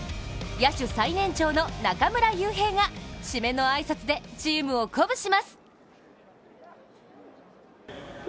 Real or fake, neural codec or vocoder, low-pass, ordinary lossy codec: real; none; none; none